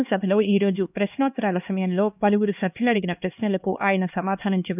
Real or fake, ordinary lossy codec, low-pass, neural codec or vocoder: fake; none; 3.6 kHz; codec, 16 kHz, 2 kbps, X-Codec, HuBERT features, trained on LibriSpeech